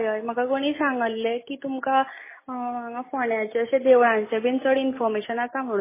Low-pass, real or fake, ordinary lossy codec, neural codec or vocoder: 3.6 kHz; real; MP3, 16 kbps; none